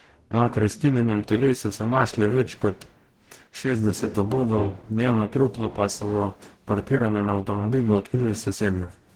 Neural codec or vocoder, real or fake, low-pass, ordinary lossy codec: codec, 44.1 kHz, 0.9 kbps, DAC; fake; 19.8 kHz; Opus, 16 kbps